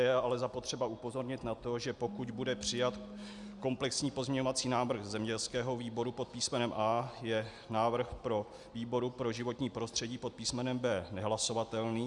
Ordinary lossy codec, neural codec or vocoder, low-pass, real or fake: Opus, 64 kbps; none; 10.8 kHz; real